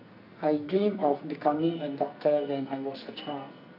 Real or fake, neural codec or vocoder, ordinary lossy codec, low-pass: fake; codec, 44.1 kHz, 2.6 kbps, SNAC; none; 5.4 kHz